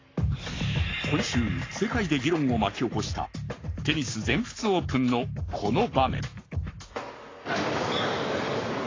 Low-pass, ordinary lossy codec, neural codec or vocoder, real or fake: 7.2 kHz; AAC, 32 kbps; codec, 44.1 kHz, 7.8 kbps, Pupu-Codec; fake